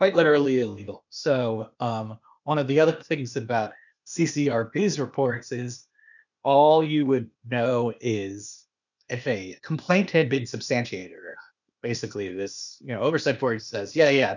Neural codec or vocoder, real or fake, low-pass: codec, 16 kHz, 0.8 kbps, ZipCodec; fake; 7.2 kHz